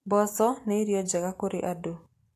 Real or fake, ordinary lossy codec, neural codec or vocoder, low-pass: real; AAC, 64 kbps; none; 14.4 kHz